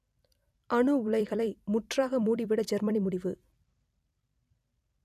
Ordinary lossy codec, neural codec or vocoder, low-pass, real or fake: none; vocoder, 44.1 kHz, 128 mel bands every 256 samples, BigVGAN v2; 14.4 kHz; fake